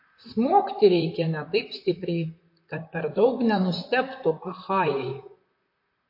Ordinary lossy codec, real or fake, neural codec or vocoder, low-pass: MP3, 32 kbps; fake; vocoder, 44.1 kHz, 128 mel bands, Pupu-Vocoder; 5.4 kHz